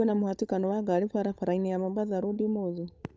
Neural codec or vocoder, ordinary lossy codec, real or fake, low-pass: codec, 16 kHz, 8 kbps, FunCodec, trained on Chinese and English, 25 frames a second; none; fake; none